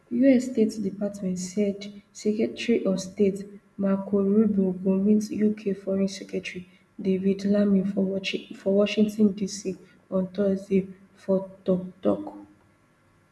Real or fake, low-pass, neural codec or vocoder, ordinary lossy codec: real; none; none; none